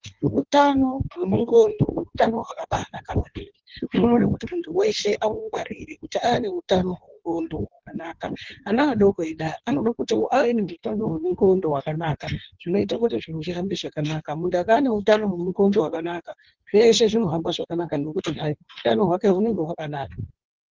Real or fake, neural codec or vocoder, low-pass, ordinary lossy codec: fake; codec, 16 kHz in and 24 kHz out, 1.1 kbps, FireRedTTS-2 codec; 7.2 kHz; Opus, 16 kbps